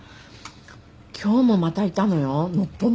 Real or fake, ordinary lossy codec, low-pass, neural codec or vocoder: real; none; none; none